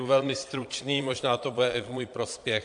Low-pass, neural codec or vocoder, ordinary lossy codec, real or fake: 9.9 kHz; vocoder, 22.05 kHz, 80 mel bands, Vocos; AAC, 64 kbps; fake